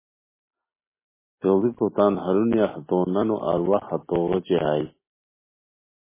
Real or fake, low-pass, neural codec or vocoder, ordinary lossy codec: real; 3.6 kHz; none; MP3, 16 kbps